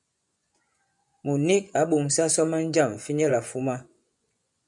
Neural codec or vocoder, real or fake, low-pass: vocoder, 24 kHz, 100 mel bands, Vocos; fake; 10.8 kHz